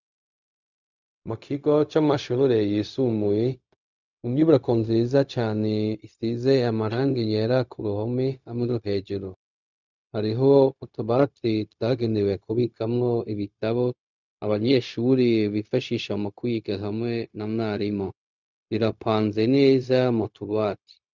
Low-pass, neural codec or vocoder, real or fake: 7.2 kHz; codec, 16 kHz, 0.4 kbps, LongCat-Audio-Codec; fake